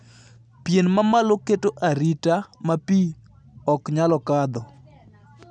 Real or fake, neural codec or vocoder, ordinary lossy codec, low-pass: real; none; none; 9.9 kHz